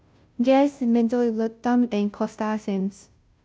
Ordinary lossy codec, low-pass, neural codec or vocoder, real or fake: none; none; codec, 16 kHz, 0.5 kbps, FunCodec, trained on Chinese and English, 25 frames a second; fake